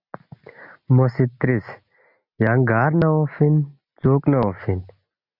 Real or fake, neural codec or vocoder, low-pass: real; none; 5.4 kHz